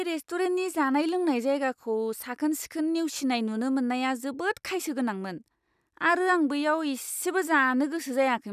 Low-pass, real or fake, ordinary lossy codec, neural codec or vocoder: 14.4 kHz; real; none; none